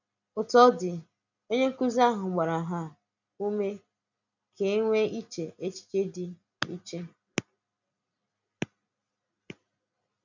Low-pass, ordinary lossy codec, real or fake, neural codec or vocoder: 7.2 kHz; none; real; none